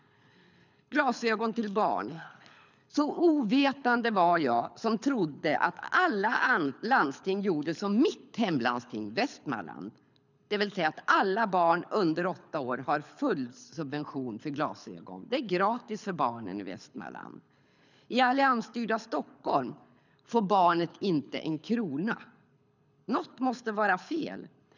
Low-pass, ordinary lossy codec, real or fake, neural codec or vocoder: 7.2 kHz; none; fake; codec, 24 kHz, 6 kbps, HILCodec